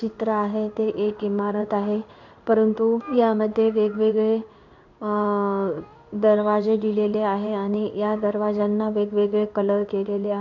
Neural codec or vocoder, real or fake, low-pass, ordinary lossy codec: codec, 16 kHz in and 24 kHz out, 1 kbps, XY-Tokenizer; fake; 7.2 kHz; none